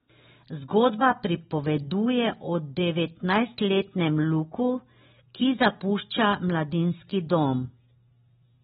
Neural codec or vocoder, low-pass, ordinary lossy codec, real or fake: none; 19.8 kHz; AAC, 16 kbps; real